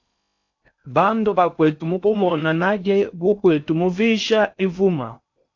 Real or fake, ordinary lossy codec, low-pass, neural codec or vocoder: fake; AAC, 48 kbps; 7.2 kHz; codec, 16 kHz in and 24 kHz out, 0.6 kbps, FocalCodec, streaming, 4096 codes